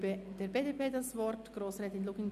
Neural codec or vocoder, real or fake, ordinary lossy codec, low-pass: none; real; none; 14.4 kHz